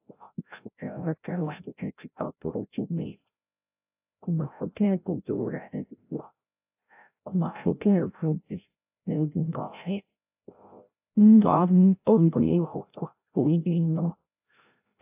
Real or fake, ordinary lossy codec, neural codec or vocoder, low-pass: fake; AAC, 32 kbps; codec, 16 kHz, 0.5 kbps, FreqCodec, larger model; 3.6 kHz